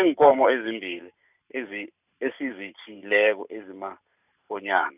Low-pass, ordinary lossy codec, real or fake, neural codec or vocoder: 3.6 kHz; none; fake; vocoder, 44.1 kHz, 128 mel bands every 512 samples, BigVGAN v2